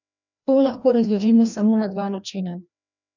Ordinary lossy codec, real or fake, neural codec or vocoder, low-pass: none; fake; codec, 16 kHz, 1 kbps, FreqCodec, larger model; 7.2 kHz